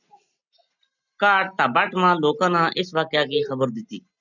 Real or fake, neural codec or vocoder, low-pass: real; none; 7.2 kHz